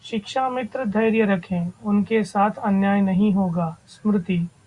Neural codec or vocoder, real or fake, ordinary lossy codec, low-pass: none; real; Opus, 64 kbps; 10.8 kHz